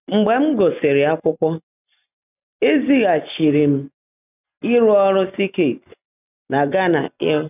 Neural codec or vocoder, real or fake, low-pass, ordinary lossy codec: none; real; 3.6 kHz; none